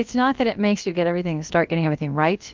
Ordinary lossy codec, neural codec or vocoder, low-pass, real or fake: Opus, 24 kbps; codec, 16 kHz, about 1 kbps, DyCAST, with the encoder's durations; 7.2 kHz; fake